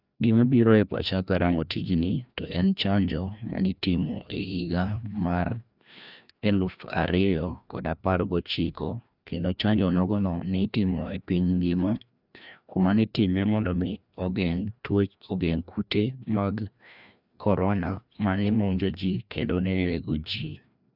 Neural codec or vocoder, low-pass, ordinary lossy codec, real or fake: codec, 16 kHz, 1 kbps, FreqCodec, larger model; 5.4 kHz; none; fake